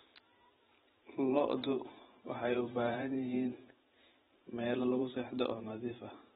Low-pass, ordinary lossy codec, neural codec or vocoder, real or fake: 19.8 kHz; AAC, 16 kbps; vocoder, 44.1 kHz, 128 mel bands every 512 samples, BigVGAN v2; fake